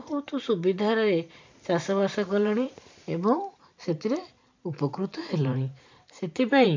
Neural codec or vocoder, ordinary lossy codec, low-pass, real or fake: vocoder, 44.1 kHz, 128 mel bands every 512 samples, BigVGAN v2; MP3, 64 kbps; 7.2 kHz; fake